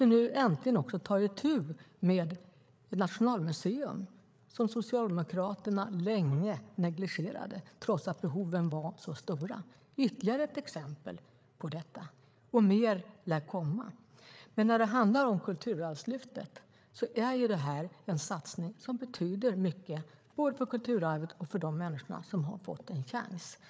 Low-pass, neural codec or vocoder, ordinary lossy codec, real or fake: none; codec, 16 kHz, 8 kbps, FreqCodec, larger model; none; fake